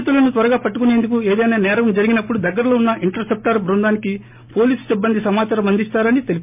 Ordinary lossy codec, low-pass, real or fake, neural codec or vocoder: MP3, 32 kbps; 3.6 kHz; real; none